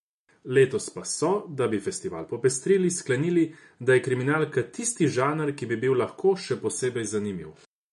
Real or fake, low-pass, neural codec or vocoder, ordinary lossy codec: real; 14.4 kHz; none; MP3, 48 kbps